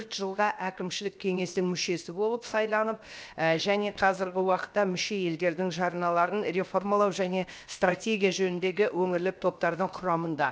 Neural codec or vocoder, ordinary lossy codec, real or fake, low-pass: codec, 16 kHz, 0.7 kbps, FocalCodec; none; fake; none